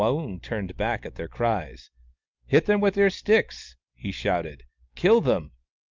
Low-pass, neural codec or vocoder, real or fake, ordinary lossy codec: 7.2 kHz; none; real; Opus, 24 kbps